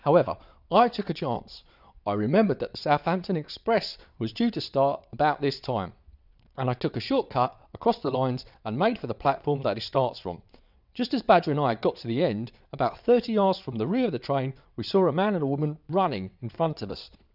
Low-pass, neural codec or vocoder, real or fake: 5.4 kHz; vocoder, 22.05 kHz, 80 mel bands, WaveNeXt; fake